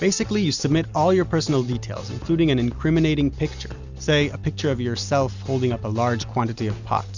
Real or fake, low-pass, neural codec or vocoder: real; 7.2 kHz; none